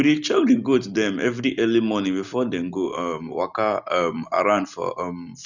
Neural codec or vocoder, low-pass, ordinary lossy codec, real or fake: none; 7.2 kHz; none; real